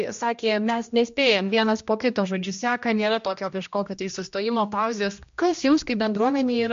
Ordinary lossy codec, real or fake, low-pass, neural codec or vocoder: MP3, 48 kbps; fake; 7.2 kHz; codec, 16 kHz, 1 kbps, X-Codec, HuBERT features, trained on general audio